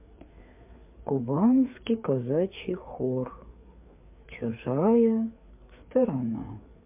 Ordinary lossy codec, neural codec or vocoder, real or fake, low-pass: MP3, 32 kbps; codec, 16 kHz, 4 kbps, FreqCodec, larger model; fake; 3.6 kHz